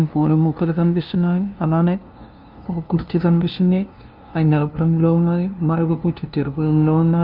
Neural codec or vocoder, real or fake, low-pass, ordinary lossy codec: codec, 16 kHz, 0.5 kbps, FunCodec, trained on LibriTTS, 25 frames a second; fake; 5.4 kHz; Opus, 32 kbps